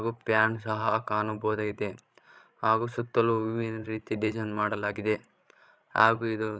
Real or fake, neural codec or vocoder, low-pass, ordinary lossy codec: fake; codec, 16 kHz, 16 kbps, FreqCodec, larger model; none; none